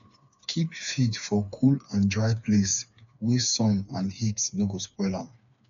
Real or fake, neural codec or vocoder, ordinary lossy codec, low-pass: fake; codec, 16 kHz, 4 kbps, FreqCodec, smaller model; none; 7.2 kHz